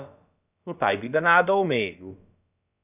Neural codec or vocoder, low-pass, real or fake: codec, 16 kHz, about 1 kbps, DyCAST, with the encoder's durations; 3.6 kHz; fake